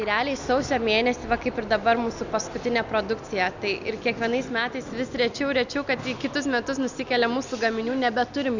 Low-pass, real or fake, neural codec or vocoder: 7.2 kHz; real; none